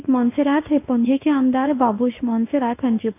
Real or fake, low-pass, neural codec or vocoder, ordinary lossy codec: fake; 3.6 kHz; codec, 24 kHz, 0.9 kbps, WavTokenizer, medium speech release version 1; AAC, 24 kbps